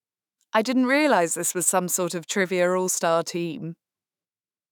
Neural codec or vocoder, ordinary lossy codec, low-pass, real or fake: autoencoder, 48 kHz, 128 numbers a frame, DAC-VAE, trained on Japanese speech; none; none; fake